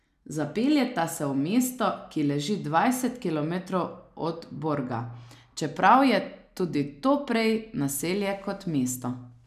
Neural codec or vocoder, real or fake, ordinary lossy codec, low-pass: none; real; none; 14.4 kHz